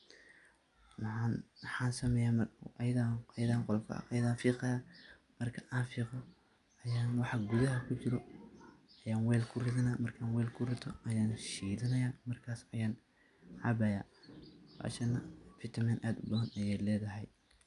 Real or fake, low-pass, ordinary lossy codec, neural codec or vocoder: real; 10.8 kHz; none; none